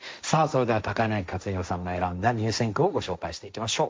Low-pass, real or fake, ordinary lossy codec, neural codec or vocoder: none; fake; none; codec, 16 kHz, 1.1 kbps, Voila-Tokenizer